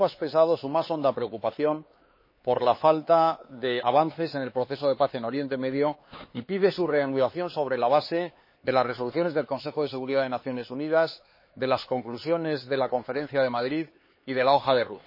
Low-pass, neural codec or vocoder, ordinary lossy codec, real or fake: 5.4 kHz; codec, 16 kHz, 4 kbps, X-Codec, HuBERT features, trained on LibriSpeech; MP3, 24 kbps; fake